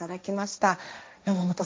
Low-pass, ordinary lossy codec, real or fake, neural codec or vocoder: none; none; fake; codec, 16 kHz, 1.1 kbps, Voila-Tokenizer